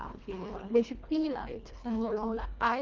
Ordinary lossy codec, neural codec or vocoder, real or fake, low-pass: Opus, 24 kbps; codec, 24 kHz, 1.5 kbps, HILCodec; fake; 7.2 kHz